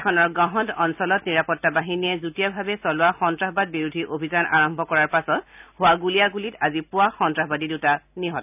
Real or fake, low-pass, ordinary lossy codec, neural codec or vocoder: real; 3.6 kHz; none; none